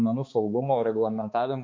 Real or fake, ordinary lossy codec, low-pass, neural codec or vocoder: fake; MP3, 48 kbps; 7.2 kHz; codec, 16 kHz, 2 kbps, X-Codec, HuBERT features, trained on balanced general audio